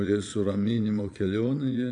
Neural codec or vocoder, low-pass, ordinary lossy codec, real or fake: vocoder, 22.05 kHz, 80 mel bands, Vocos; 9.9 kHz; AAC, 64 kbps; fake